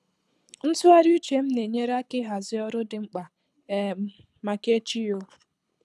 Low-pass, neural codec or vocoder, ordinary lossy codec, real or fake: none; codec, 24 kHz, 6 kbps, HILCodec; none; fake